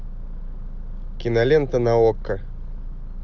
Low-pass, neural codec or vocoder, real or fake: 7.2 kHz; none; real